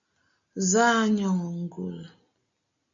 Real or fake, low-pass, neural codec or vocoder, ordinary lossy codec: real; 7.2 kHz; none; AAC, 64 kbps